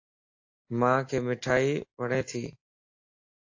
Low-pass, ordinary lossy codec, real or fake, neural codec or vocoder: 7.2 kHz; AAC, 48 kbps; real; none